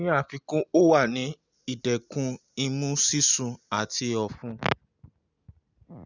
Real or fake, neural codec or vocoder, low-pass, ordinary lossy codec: real; none; 7.2 kHz; none